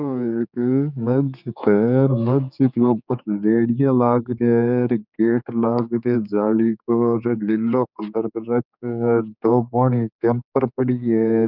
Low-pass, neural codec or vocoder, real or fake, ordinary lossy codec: 5.4 kHz; codec, 16 kHz, 4 kbps, X-Codec, HuBERT features, trained on general audio; fake; none